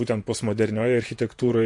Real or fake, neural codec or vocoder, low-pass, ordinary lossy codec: fake; vocoder, 48 kHz, 128 mel bands, Vocos; 10.8 kHz; MP3, 48 kbps